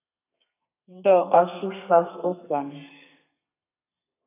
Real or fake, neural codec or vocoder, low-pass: fake; codec, 32 kHz, 1.9 kbps, SNAC; 3.6 kHz